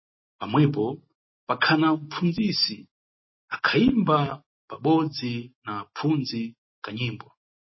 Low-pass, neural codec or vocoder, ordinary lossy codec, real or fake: 7.2 kHz; none; MP3, 24 kbps; real